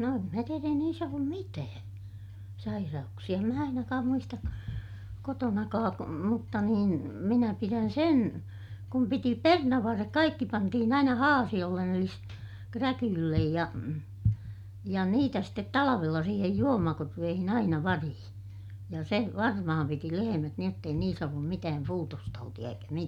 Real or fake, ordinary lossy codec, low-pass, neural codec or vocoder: real; none; 19.8 kHz; none